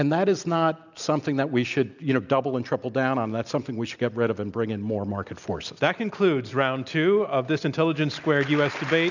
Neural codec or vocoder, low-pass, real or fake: none; 7.2 kHz; real